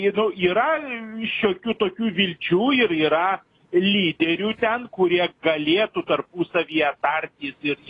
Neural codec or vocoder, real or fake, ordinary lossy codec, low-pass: none; real; AAC, 32 kbps; 10.8 kHz